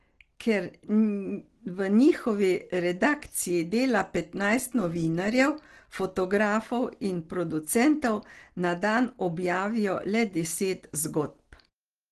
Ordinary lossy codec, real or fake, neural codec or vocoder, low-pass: Opus, 16 kbps; real; none; 10.8 kHz